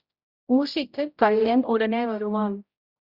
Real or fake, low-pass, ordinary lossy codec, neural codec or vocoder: fake; 5.4 kHz; Opus, 64 kbps; codec, 16 kHz, 0.5 kbps, X-Codec, HuBERT features, trained on general audio